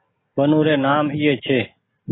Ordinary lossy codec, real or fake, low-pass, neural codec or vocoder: AAC, 16 kbps; real; 7.2 kHz; none